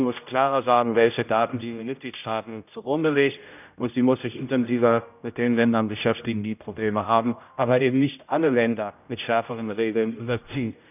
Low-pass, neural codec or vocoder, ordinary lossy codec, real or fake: 3.6 kHz; codec, 16 kHz, 0.5 kbps, X-Codec, HuBERT features, trained on general audio; none; fake